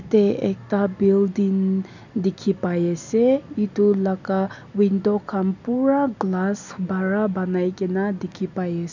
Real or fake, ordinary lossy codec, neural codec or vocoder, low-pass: real; none; none; 7.2 kHz